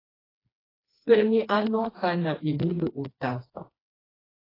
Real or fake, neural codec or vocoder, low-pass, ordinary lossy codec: fake; codec, 16 kHz, 2 kbps, FreqCodec, smaller model; 5.4 kHz; AAC, 24 kbps